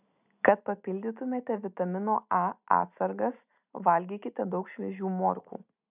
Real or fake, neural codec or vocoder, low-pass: real; none; 3.6 kHz